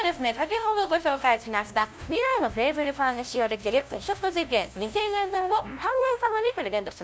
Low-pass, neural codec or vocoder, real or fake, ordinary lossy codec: none; codec, 16 kHz, 0.5 kbps, FunCodec, trained on LibriTTS, 25 frames a second; fake; none